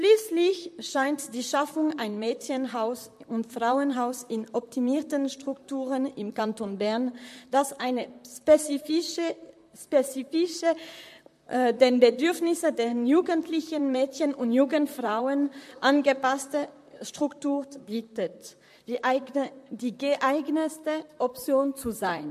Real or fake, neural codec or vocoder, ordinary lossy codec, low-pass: fake; vocoder, 44.1 kHz, 128 mel bands, Pupu-Vocoder; MP3, 64 kbps; 14.4 kHz